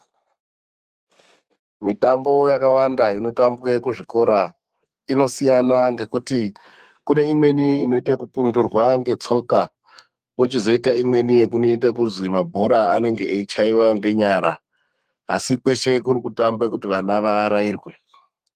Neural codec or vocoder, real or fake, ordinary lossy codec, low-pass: codec, 32 kHz, 1.9 kbps, SNAC; fake; Opus, 32 kbps; 14.4 kHz